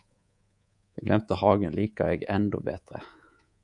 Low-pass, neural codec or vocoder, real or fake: 10.8 kHz; codec, 24 kHz, 3.1 kbps, DualCodec; fake